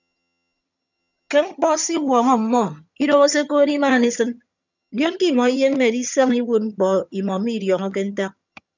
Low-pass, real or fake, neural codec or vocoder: 7.2 kHz; fake; vocoder, 22.05 kHz, 80 mel bands, HiFi-GAN